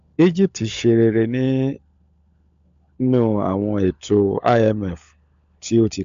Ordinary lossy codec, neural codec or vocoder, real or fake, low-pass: AAC, 64 kbps; codec, 16 kHz, 16 kbps, FunCodec, trained on LibriTTS, 50 frames a second; fake; 7.2 kHz